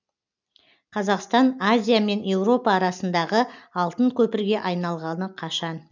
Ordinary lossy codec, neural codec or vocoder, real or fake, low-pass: none; none; real; 7.2 kHz